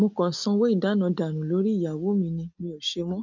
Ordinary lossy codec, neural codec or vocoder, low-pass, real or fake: none; none; 7.2 kHz; real